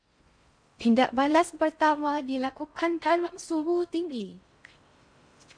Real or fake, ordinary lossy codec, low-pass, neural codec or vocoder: fake; MP3, 64 kbps; 9.9 kHz; codec, 16 kHz in and 24 kHz out, 0.6 kbps, FocalCodec, streaming, 4096 codes